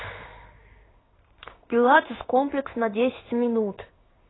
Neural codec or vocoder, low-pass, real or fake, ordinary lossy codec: codec, 16 kHz in and 24 kHz out, 1 kbps, XY-Tokenizer; 7.2 kHz; fake; AAC, 16 kbps